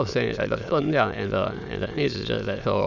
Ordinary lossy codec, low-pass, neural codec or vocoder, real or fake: none; 7.2 kHz; autoencoder, 22.05 kHz, a latent of 192 numbers a frame, VITS, trained on many speakers; fake